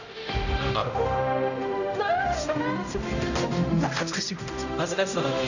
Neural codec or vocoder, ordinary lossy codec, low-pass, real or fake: codec, 16 kHz, 0.5 kbps, X-Codec, HuBERT features, trained on general audio; none; 7.2 kHz; fake